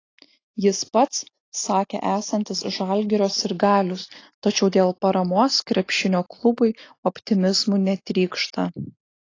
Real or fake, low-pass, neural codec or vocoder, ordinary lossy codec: real; 7.2 kHz; none; AAC, 32 kbps